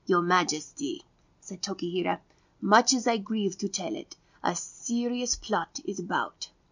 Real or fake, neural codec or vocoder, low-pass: real; none; 7.2 kHz